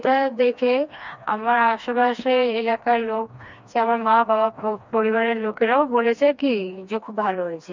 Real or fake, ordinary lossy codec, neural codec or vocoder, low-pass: fake; MP3, 64 kbps; codec, 16 kHz, 2 kbps, FreqCodec, smaller model; 7.2 kHz